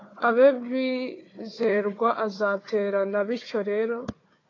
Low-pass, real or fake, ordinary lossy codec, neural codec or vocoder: 7.2 kHz; fake; AAC, 32 kbps; codec, 16 kHz, 4 kbps, FunCodec, trained on Chinese and English, 50 frames a second